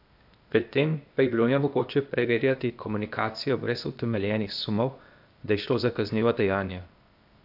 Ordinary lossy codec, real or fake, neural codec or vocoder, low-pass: AAC, 48 kbps; fake; codec, 16 kHz, 0.8 kbps, ZipCodec; 5.4 kHz